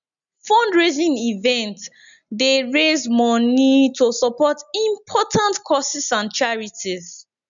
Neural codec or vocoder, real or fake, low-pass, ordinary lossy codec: none; real; 7.2 kHz; none